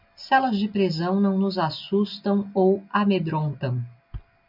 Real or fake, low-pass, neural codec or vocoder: real; 5.4 kHz; none